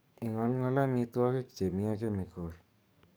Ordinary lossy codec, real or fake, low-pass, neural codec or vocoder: none; fake; none; codec, 44.1 kHz, 7.8 kbps, DAC